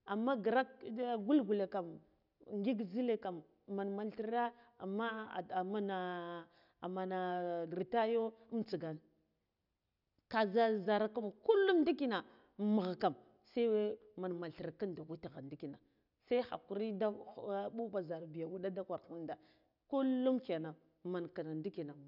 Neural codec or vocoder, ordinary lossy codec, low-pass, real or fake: none; none; 5.4 kHz; real